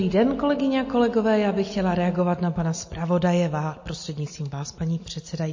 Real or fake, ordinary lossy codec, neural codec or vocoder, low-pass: real; MP3, 32 kbps; none; 7.2 kHz